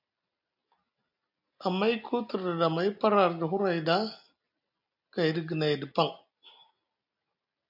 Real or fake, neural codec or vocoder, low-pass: real; none; 5.4 kHz